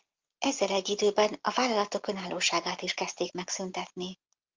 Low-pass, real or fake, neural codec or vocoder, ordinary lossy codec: 7.2 kHz; real; none; Opus, 24 kbps